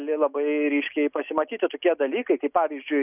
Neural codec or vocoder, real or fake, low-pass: none; real; 3.6 kHz